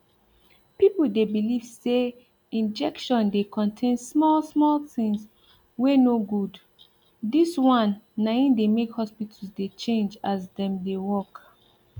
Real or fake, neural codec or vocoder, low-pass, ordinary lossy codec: real; none; 19.8 kHz; none